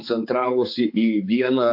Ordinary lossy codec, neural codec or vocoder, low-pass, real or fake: AAC, 48 kbps; codec, 16 kHz, 4 kbps, X-Codec, HuBERT features, trained on balanced general audio; 5.4 kHz; fake